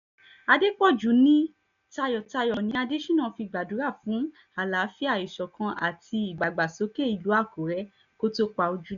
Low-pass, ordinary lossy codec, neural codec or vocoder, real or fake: 7.2 kHz; none; none; real